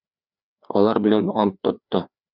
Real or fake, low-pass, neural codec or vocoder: fake; 5.4 kHz; codec, 16 kHz, 2 kbps, FreqCodec, larger model